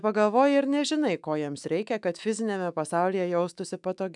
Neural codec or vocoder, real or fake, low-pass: autoencoder, 48 kHz, 128 numbers a frame, DAC-VAE, trained on Japanese speech; fake; 10.8 kHz